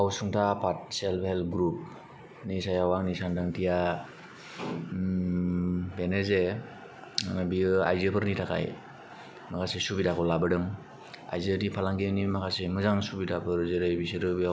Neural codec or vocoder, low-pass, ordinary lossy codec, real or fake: none; none; none; real